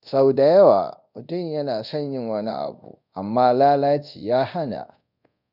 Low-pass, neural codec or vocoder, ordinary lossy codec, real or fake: 5.4 kHz; codec, 24 kHz, 0.5 kbps, DualCodec; none; fake